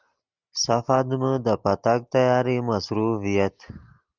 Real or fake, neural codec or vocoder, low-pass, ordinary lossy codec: real; none; 7.2 kHz; Opus, 32 kbps